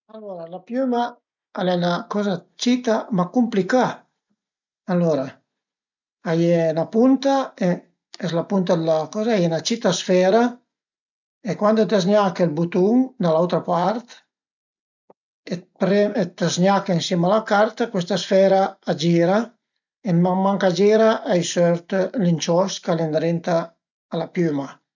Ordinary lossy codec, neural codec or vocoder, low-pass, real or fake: none; none; 7.2 kHz; real